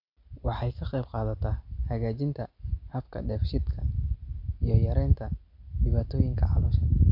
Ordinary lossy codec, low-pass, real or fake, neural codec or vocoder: none; 5.4 kHz; real; none